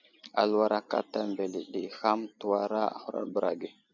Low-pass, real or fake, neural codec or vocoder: 7.2 kHz; real; none